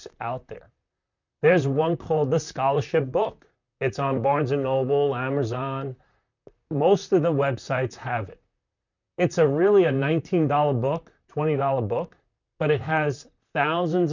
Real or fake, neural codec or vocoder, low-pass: fake; vocoder, 44.1 kHz, 128 mel bands, Pupu-Vocoder; 7.2 kHz